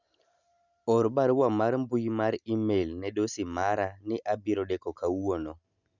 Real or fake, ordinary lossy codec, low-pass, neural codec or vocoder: real; none; 7.2 kHz; none